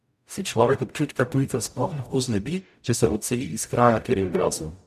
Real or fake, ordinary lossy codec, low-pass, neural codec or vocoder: fake; none; 14.4 kHz; codec, 44.1 kHz, 0.9 kbps, DAC